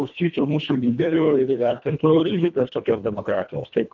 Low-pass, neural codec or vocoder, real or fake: 7.2 kHz; codec, 24 kHz, 1.5 kbps, HILCodec; fake